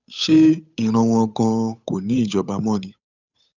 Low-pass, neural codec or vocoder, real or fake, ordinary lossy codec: 7.2 kHz; codec, 16 kHz, 8 kbps, FunCodec, trained on Chinese and English, 25 frames a second; fake; none